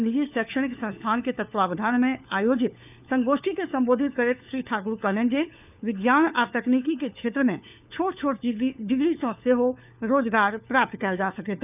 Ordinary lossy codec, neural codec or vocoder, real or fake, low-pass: none; codec, 16 kHz, 4 kbps, FunCodec, trained on LibriTTS, 50 frames a second; fake; 3.6 kHz